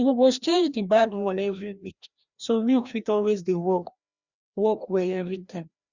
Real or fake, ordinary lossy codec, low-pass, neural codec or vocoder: fake; Opus, 64 kbps; 7.2 kHz; codec, 16 kHz, 1 kbps, FreqCodec, larger model